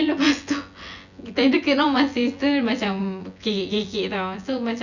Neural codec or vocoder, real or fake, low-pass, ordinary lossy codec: vocoder, 24 kHz, 100 mel bands, Vocos; fake; 7.2 kHz; none